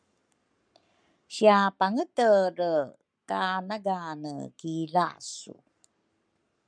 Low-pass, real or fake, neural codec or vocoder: 9.9 kHz; fake; codec, 44.1 kHz, 7.8 kbps, Pupu-Codec